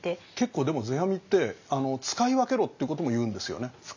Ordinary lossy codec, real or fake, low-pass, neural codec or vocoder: none; real; 7.2 kHz; none